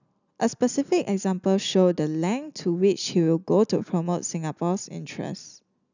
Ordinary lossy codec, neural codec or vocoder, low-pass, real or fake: none; none; 7.2 kHz; real